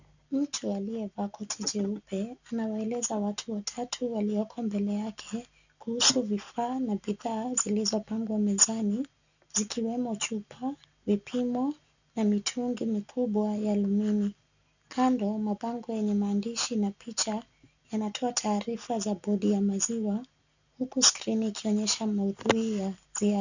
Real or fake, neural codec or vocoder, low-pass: real; none; 7.2 kHz